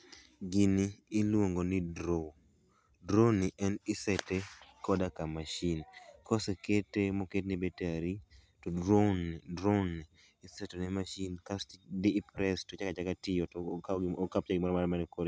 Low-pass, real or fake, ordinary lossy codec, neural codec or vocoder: none; real; none; none